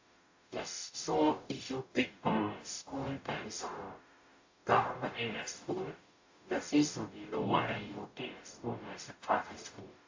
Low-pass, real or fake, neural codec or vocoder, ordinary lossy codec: 7.2 kHz; fake; codec, 44.1 kHz, 0.9 kbps, DAC; AAC, 48 kbps